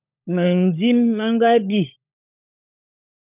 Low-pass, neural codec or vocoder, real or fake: 3.6 kHz; codec, 16 kHz, 16 kbps, FunCodec, trained on LibriTTS, 50 frames a second; fake